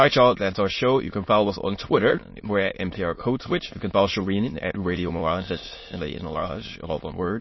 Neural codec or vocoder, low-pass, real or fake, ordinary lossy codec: autoencoder, 22.05 kHz, a latent of 192 numbers a frame, VITS, trained on many speakers; 7.2 kHz; fake; MP3, 24 kbps